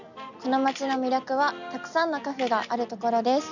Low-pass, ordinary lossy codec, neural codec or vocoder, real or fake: 7.2 kHz; none; none; real